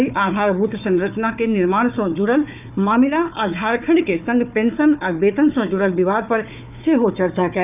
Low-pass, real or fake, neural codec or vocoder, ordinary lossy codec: 3.6 kHz; fake; codec, 16 kHz, 4 kbps, FunCodec, trained on Chinese and English, 50 frames a second; none